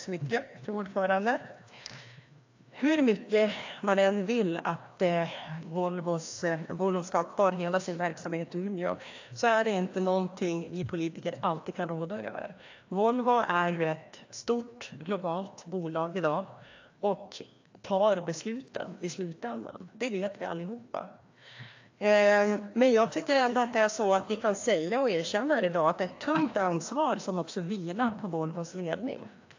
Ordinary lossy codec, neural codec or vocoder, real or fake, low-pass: AAC, 48 kbps; codec, 16 kHz, 1 kbps, FreqCodec, larger model; fake; 7.2 kHz